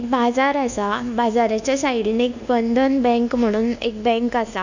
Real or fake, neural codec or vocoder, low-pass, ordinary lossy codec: fake; codec, 24 kHz, 1.2 kbps, DualCodec; 7.2 kHz; none